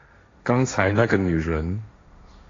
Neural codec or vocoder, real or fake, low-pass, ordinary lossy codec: codec, 16 kHz, 1.1 kbps, Voila-Tokenizer; fake; 7.2 kHz; AAC, 32 kbps